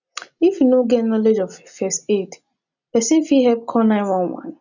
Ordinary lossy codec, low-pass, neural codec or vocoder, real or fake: none; 7.2 kHz; none; real